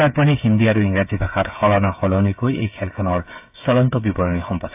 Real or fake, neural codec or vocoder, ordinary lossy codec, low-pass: fake; codec, 16 kHz, 8 kbps, FreqCodec, smaller model; AAC, 32 kbps; 3.6 kHz